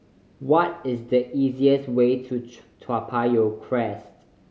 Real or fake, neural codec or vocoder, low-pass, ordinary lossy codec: real; none; none; none